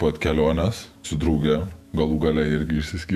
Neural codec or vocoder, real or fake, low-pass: autoencoder, 48 kHz, 128 numbers a frame, DAC-VAE, trained on Japanese speech; fake; 14.4 kHz